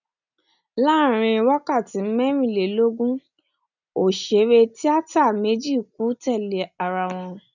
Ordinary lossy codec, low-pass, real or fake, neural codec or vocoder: none; 7.2 kHz; real; none